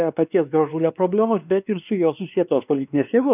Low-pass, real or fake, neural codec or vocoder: 3.6 kHz; fake; codec, 16 kHz, 2 kbps, X-Codec, WavLM features, trained on Multilingual LibriSpeech